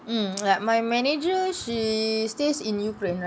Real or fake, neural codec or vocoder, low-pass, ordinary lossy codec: real; none; none; none